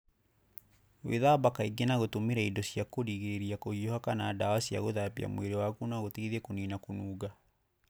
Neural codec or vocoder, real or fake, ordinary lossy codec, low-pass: none; real; none; none